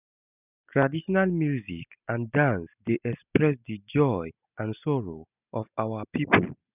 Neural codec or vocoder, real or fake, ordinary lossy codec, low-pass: none; real; none; 3.6 kHz